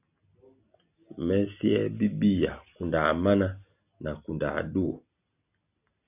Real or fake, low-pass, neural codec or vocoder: real; 3.6 kHz; none